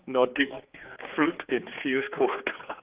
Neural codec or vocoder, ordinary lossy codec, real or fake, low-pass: codec, 16 kHz, 4 kbps, X-Codec, HuBERT features, trained on general audio; Opus, 32 kbps; fake; 3.6 kHz